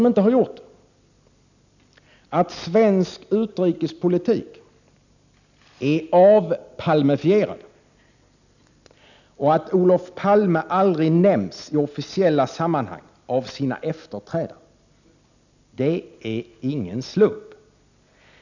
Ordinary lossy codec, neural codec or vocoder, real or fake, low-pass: none; none; real; 7.2 kHz